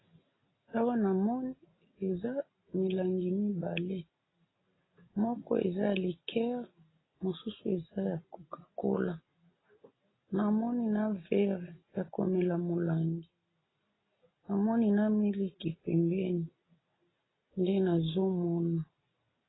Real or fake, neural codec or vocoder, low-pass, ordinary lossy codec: real; none; 7.2 kHz; AAC, 16 kbps